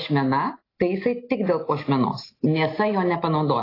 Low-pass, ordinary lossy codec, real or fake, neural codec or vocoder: 5.4 kHz; AAC, 24 kbps; real; none